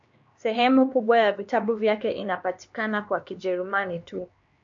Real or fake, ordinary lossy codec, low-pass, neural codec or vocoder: fake; MP3, 48 kbps; 7.2 kHz; codec, 16 kHz, 2 kbps, X-Codec, HuBERT features, trained on LibriSpeech